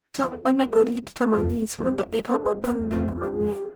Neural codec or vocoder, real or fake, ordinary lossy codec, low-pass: codec, 44.1 kHz, 0.9 kbps, DAC; fake; none; none